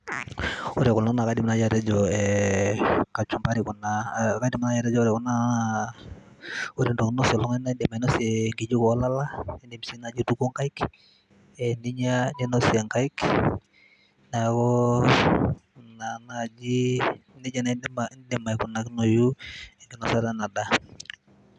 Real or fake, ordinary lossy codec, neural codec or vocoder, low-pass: real; none; none; 10.8 kHz